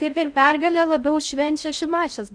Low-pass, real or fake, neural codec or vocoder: 9.9 kHz; fake; codec, 16 kHz in and 24 kHz out, 0.6 kbps, FocalCodec, streaming, 2048 codes